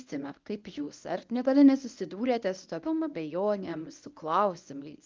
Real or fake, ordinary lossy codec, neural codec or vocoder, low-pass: fake; Opus, 24 kbps; codec, 24 kHz, 0.9 kbps, WavTokenizer, medium speech release version 1; 7.2 kHz